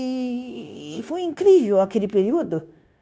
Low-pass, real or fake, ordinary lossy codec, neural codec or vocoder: none; fake; none; codec, 16 kHz, 0.9 kbps, LongCat-Audio-Codec